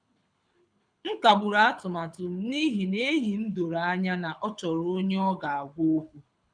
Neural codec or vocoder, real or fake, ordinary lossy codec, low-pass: codec, 24 kHz, 6 kbps, HILCodec; fake; none; 9.9 kHz